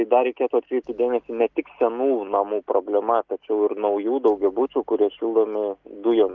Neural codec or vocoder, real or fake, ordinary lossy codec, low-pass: none; real; Opus, 32 kbps; 7.2 kHz